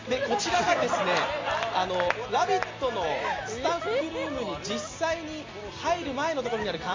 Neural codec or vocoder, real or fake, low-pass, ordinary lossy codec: none; real; 7.2 kHz; MP3, 48 kbps